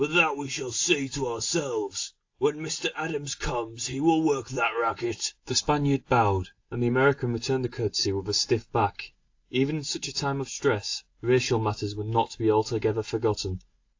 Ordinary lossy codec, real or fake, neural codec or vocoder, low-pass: AAC, 48 kbps; real; none; 7.2 kHz